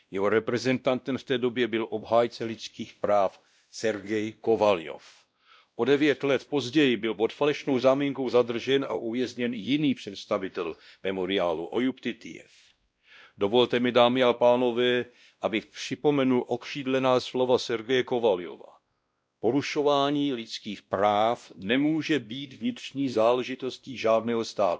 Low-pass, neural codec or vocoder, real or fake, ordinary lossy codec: none; codec, 16 kHz, 1 kbps, X-Codec, WavLM features, trained on Multilingual LibriSpeech; fake; none